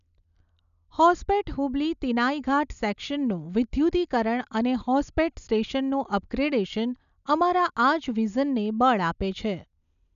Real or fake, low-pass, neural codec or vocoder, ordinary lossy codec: real; 7.2 kHz; none; none